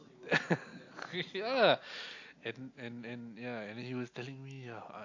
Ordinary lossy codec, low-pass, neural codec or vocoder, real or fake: AAC, 48 kbps; 7.2 kHz; none; real